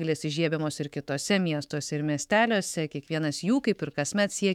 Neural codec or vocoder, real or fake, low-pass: autoencoder, 48 kHz, 128 numbers a frame, DAC-VAE, trained on Japanese speech; fake; 19.8 kHz